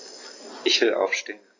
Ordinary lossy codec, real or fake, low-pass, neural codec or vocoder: AAC, 32 kbps; real; 7.2 kHz; none